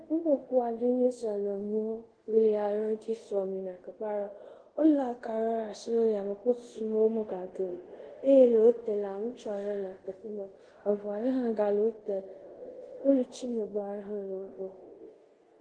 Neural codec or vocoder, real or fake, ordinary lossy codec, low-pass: codec, 24 kHz, 0.5 kbps, DualCodec; fake; Opus, 16 kbps; 9.9 kHz